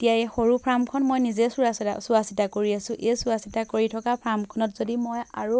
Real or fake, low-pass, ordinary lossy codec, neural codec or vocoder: real; none; none; none